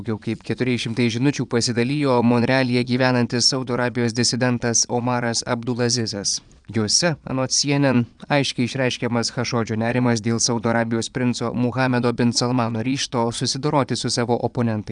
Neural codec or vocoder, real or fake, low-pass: vocoder, 22.05 kHz, 80 mel bands, Vocos; fake; 9.9 kHz